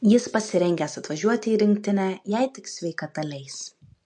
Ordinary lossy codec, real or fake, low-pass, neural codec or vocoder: MP3, 48 kbps; real; 10.8 kHz; none